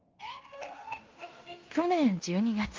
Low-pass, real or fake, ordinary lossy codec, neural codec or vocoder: 7.2 kHz; fake; Opus, 24 kbps; codec, 16 kHz in and 24 kHz out, 0.9 kbps, LongCat-Audio-Codec, fine tuned four codebook decoder